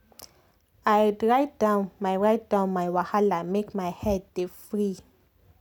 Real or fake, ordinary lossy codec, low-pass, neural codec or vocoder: real; none; 19.8 kHz; none